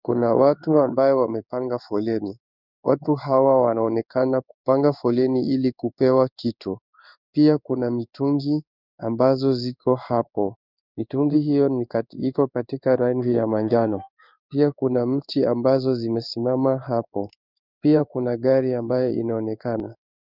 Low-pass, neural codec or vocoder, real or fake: 5.4 kHz; codec, 16 kHz in and 24 kHz out, 1 kbps, XY-Tokenizer; fake